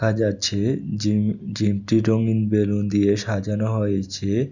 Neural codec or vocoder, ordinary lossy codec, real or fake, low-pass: none; none; real; 7.2 kHz